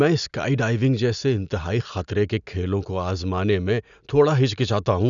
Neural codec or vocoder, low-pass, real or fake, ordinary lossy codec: none; 7.2 kHz; real; none